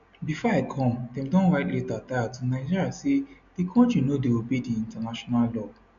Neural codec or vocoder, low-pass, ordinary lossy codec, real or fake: none; 7.2 kHz; none; real